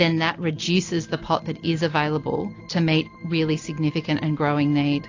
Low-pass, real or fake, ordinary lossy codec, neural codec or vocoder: 7.2 kHz; real; AAC, 48 kbps; none